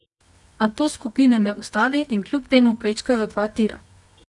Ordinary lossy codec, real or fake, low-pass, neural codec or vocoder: none; fake; 10.8 kHz; codec, 24 kHz, 0.9 kbps, WavTokenizer, medium music audio release